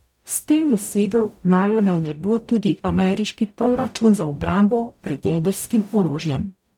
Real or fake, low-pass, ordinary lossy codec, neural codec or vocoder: fake; 19.8 kHz; none; codec, 44.1 kHz, 0.9 kbps, DAC